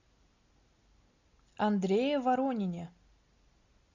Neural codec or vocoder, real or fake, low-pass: none; real; 7.2 kHz